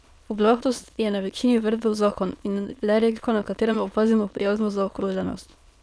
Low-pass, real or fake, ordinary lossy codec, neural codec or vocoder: none; fake; none; autoencoder, 22.05 kHz, a latent of 192 numbers a frame, VITS, trained on many speakers